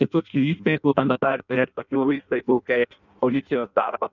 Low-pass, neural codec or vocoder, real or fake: 7.2 kHz; codec, 16 kHz in and 24 kHz out, 0.6 kbps, FireRedTTS-2 codec; fake